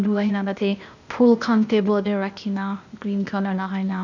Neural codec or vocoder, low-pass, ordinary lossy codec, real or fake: codec, 16 kHz, 0.8 kbps, ZipCodec; 7.2 kHz; MP3, 64 kbps; fake